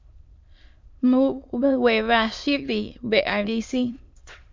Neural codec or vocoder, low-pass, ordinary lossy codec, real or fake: autoencoder, 22.05 kHz, a latent of 192 numbers a frame, VITS, trained on many speakers; 7.2 kHz; MP3, 48 kbps; fake